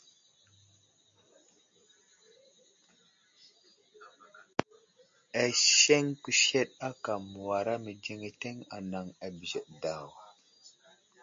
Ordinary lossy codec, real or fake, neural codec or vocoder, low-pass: MP3, 48 kbps; real; none; 7.2 kHz